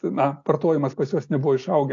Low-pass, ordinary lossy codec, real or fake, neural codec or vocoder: 7.2 kHz; AAC, 48 kbps; real; none